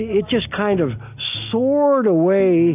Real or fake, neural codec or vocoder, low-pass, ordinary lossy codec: real; none; 3.6 kHz; AAC, 32 kbps